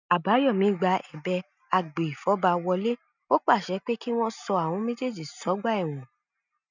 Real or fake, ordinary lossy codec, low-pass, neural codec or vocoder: real; none; 7.2 kHz; none